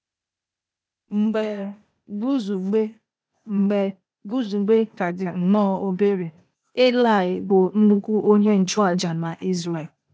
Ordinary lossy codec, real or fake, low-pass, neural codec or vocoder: none; fake; none; codec, 16 kHz, 0.8 kbps, ZipCodec